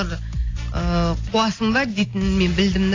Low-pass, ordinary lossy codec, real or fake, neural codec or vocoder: 7.2 kHz; AAC, 32 kbps; real; none